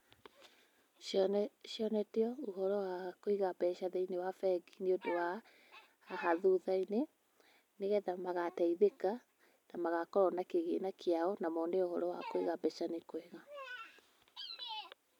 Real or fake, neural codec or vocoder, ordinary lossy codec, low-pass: real; none; none; 19.8 kHz